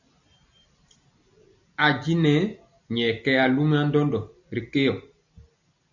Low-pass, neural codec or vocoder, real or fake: 7.2 kHz; none; real